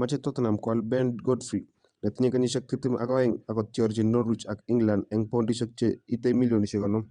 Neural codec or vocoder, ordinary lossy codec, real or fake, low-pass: vocoder, 22.05 kHz, 80 mel bands, WaveNeXt; Opus, 64 kbps; fake; 9.9 kHz